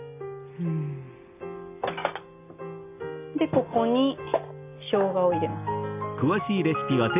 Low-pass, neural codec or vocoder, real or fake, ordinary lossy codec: 3.6 kHz; none; real; AAC, 32 kbps